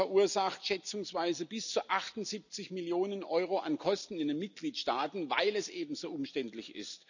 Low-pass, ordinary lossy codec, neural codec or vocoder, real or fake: 7.2 kHz; none; none; real